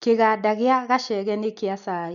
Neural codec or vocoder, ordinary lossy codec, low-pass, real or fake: none; none; 7.2 kHz; real